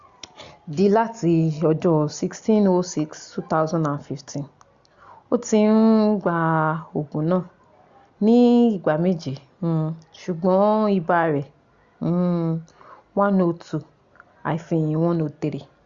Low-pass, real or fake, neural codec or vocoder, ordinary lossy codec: 7.2 kHz; real; none; Opus, 64 kbps